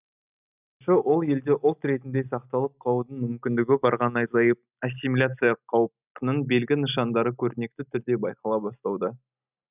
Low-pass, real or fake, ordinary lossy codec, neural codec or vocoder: 3.6 kHz; real; none; none